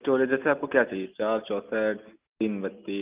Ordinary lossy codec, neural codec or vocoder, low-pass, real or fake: Opus, 24 kbps; none; 3.6 kHz; real